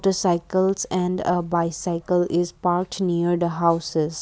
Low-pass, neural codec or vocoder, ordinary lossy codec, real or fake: none; none; none; real